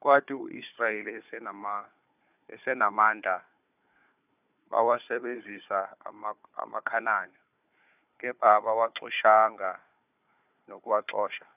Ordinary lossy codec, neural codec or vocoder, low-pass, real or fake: none; codec, 16 kHz, 4 kbps, FunCodec, trained on Chinese and English, 50 frames a second; 3.6 kHz; fake